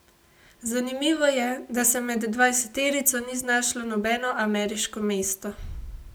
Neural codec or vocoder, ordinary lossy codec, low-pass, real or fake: none; none; none; real